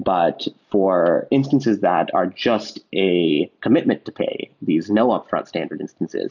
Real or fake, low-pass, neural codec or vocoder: real; 7.2 kHz; none